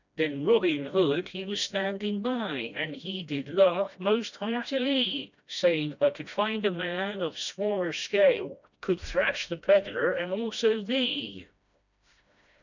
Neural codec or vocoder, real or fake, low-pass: codec, 16 kHz, 1 kbps, FreqCodec, smaller model; fake; 7.2 kHz